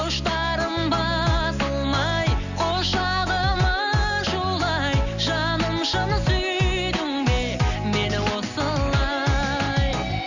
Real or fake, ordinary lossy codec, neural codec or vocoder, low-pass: real; none; none; 7.2 kHz